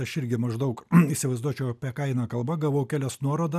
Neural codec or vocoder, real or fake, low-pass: none; real; 14.4 kHz